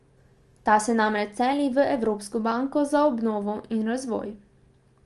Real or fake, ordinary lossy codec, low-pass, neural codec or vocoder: real; Opus, 32 kbps; 10.8 kHz; none